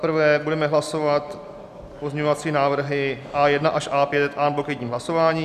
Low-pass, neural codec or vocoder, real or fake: 14.4 kHz; none; real